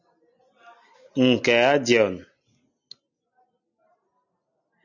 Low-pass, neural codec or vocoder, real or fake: 7.2 kHz; none; real